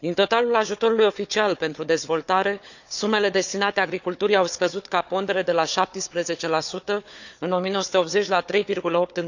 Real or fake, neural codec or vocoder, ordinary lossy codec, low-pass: fake; codec, 16 kHz, 4 kbps, FunCodec, trained on Chinese and English, 50 frames a second; none; 7.2 kHz